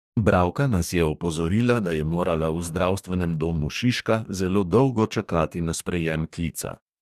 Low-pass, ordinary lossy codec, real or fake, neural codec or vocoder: 14.4 kHz; none; fake; codec, 44.1 kHz, 2.6 kbps, DAC